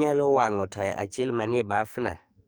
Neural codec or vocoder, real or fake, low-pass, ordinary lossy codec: codec, 44.1 kHz, 2.6 kbps, SNAC; fake; none; none